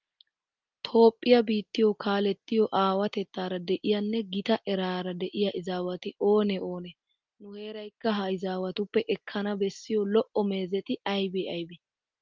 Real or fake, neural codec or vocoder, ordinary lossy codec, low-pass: real; none; Opus, 24 kbps; 7.2 kHz